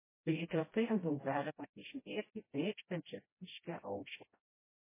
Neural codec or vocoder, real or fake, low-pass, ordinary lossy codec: codec, 16 kHz, 0.5 kbps, FreqCodec, smaller model; fake; 3.6 kHz; MP3, 16 kbps